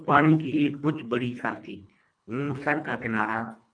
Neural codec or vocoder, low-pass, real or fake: codec, 24 kHz, 1.5 kbps, HILCodec; 9.9 kHz; fake